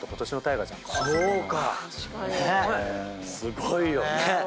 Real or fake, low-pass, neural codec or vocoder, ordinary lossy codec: real; none; none; none